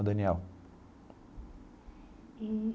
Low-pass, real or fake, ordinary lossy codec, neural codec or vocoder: none; real; none; none